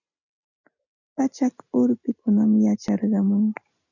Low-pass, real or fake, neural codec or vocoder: 7.2 kHz; real; none